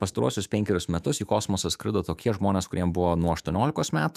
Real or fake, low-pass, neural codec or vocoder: fake; 14.4 kHz; autoencoder, 48 kHz, 128 numbers a frame, DAC-VAE, trained on Japanese speech